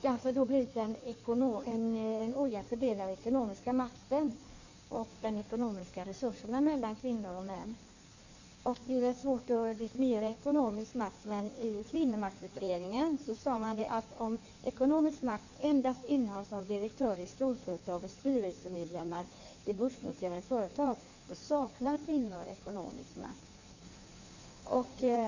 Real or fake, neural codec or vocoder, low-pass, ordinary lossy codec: fake; codec, 16 kHz in and 24 kHz out, 1.1 kbps, FireRedTTS-2 codec; 7.2 kHz; none